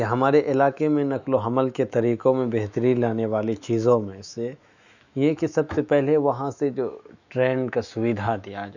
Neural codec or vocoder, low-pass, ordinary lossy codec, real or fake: autoencoder, 48 kHz, 128 numbers a frame, DAC-VAE, trained on Japanese speech; 7.2 kHz; none; fake